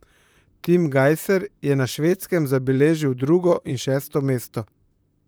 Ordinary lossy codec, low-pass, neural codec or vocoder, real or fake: none; none; vocoder, 44.1 kHz, 128 mel bands, Pupu-Vocoder; fake